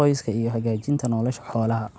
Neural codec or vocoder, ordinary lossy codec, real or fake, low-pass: none; none; real; none